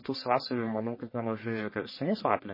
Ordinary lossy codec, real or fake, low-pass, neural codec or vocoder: MP3, 24 kbps; fake; 5.4 kHz; codec, 44.1 kHz, 1.7 kbps, Pupu-Codec